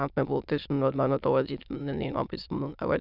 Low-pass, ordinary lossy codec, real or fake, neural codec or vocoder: 5.4 kHz; none; fake; autoencoder, 22.05 kHz, a latent of 192 numbers a frame, VITS, trained on many speakers